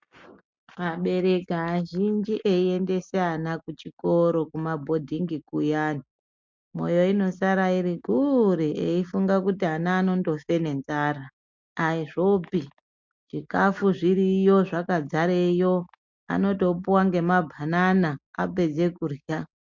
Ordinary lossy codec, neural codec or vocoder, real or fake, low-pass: MP3, 64 kbps; none; real; 7.2 kHz